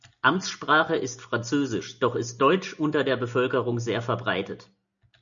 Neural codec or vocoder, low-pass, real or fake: none; 7.2 kHz; real